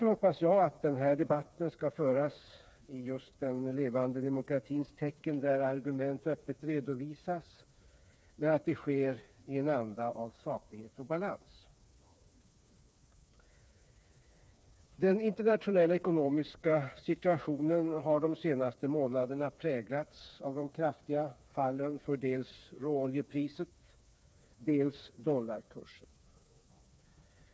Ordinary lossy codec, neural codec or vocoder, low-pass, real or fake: none; codec, 16 kHz, 4 kbps, FreqCodec, smaller model; none; fake